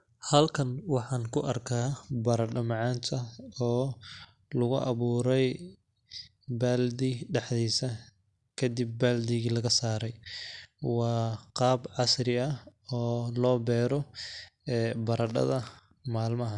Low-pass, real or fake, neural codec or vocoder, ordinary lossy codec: 10.8 kHz; real; none; none